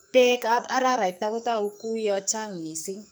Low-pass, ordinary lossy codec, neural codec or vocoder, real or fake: none; none; codec, 44.1 kHz, 2.6 kbps, SNAC; fake